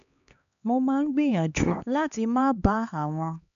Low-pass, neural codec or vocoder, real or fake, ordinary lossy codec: 7.2 kHz; codec, 16 kHz, 2 kbps, X-Codec, HuBERT features, trained on LibriSpeech; fake; none